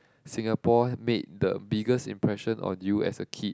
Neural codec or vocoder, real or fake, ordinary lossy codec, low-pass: none; real; none; none